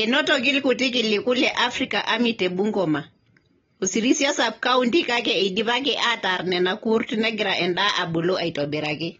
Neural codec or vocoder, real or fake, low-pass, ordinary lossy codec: none; real; 7.2 kHz; AAC, 24 kbps